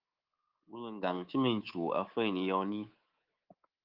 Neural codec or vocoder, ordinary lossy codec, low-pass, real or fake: codec, 24 kHz, 3.1 kbps, DualCodec; Opus, 32 kbps; 5.4 kHz; fake